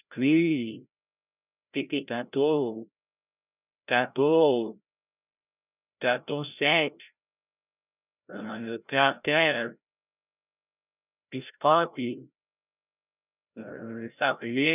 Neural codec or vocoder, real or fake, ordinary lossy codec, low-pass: codec, 16 kHz, 0.5 kbps, FreqCodec, larger model; fake; none; 3.6 kHz